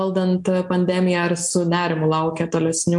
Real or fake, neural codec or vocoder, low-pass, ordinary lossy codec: real; none; 10.8 kHz; MP3, 64 kbps